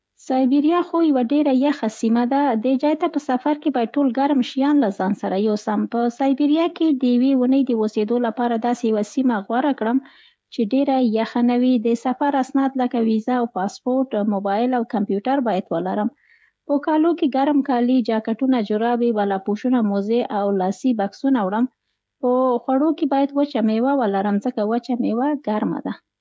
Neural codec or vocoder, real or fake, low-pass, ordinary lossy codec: codec, 16 kHz, 16 kbps, FreqCodec, smaller model; fake; none; none